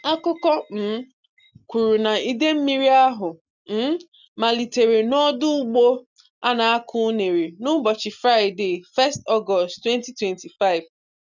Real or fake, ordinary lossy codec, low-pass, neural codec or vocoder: real; none; 7.2 kHz; none